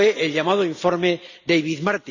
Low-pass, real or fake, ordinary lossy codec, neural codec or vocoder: 7.2 kHz; real; none; none